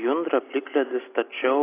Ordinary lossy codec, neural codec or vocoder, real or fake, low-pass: AAC, 24 kbps; none; real; 3.6 kHz